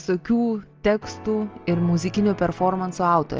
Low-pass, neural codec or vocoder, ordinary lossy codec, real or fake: 7.2 kHz; none; Opus, 24 kbps; real